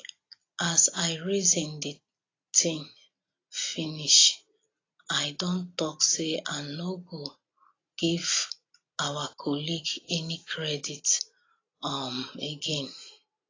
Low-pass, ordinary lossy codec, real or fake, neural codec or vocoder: 7.2 kHz; AAC, 32 kbps; real; none